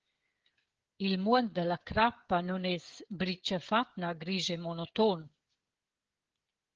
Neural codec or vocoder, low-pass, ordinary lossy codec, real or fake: codec, 16 kHz, 16 kbps, FreqCodec, smaller model; 7.2 kHz; Opus, 16 kbps; fake